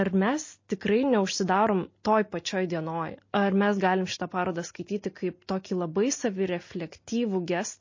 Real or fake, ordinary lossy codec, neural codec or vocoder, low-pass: real; MP3, 32 kbps; none; 7.2 kHz